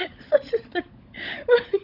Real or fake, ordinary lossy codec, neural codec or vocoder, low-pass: fake; none; codec, 16 kHz, 16 kbps, FunCodec, trained on LibriTTS, 50 frames a second; 5.4 kHz